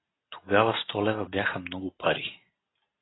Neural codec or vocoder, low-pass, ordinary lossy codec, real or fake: none; 7.2 kHz; AAC, 16 kbps; real